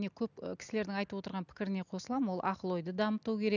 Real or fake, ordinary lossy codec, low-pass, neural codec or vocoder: real; none; 7.2 kHz; none